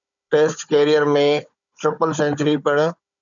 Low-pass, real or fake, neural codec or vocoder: 7.2 kHz; fake; codec, 16 kHz, 16 kbps, FunCodec, trained on Chinese and English, 50 frames a second